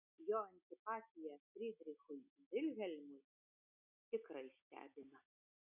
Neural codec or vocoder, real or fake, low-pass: none; real; 3.6 kHz